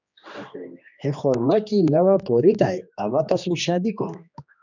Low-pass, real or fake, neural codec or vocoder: 7.2 kHz; fake; codec, 16 kHz, 2 kbps, X-Codec, HuBERT features, trained on general audio